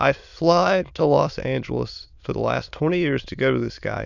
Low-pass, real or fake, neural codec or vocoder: 7.2 kHz; fake; autoencoder, 22.05 kHz, a latent of 192 numbers a frame, VITS, trained on many speakers